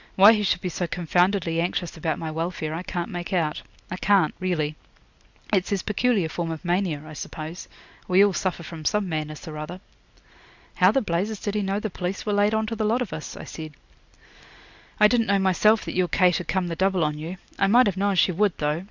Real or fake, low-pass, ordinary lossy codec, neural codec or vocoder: real; 7.2 kHz; Opus, 64 kbps; none